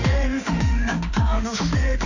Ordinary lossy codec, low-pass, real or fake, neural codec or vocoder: none; 7.2 kHz; fake; autoencoder, 48 kHz, 32 numbers a frame, DAC-VAE, trained on Japanese speech